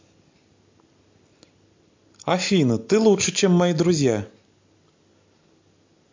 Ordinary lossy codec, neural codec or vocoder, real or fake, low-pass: MP3, 48 kbps; none; real; 7.2 kHz